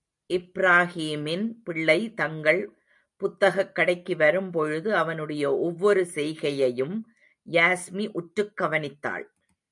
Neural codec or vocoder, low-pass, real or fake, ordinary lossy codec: none; 10.8 kHz; real; MP3, 96 kbps